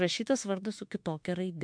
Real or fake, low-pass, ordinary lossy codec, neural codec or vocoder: fake; 9.9 kHz; MP3, 64 kbps; autoencoder, 48 kHz, 32 numbers a frame, DAC-VAE, trained on Japanese speech